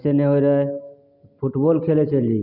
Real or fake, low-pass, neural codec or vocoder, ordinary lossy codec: real; 5.4 kHz; none; none